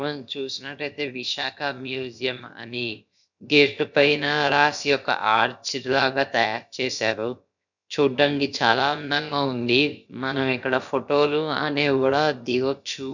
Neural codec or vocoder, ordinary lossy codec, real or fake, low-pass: codec, 16 kHz, about 1 kbps, DyCAST, with the encoder's durations; none; fake; 7.2 kHz